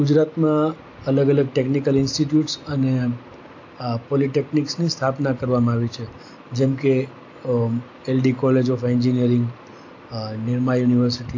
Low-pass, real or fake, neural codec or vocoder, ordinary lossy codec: 7.2 kHz; real; none; AAC, 48 kbps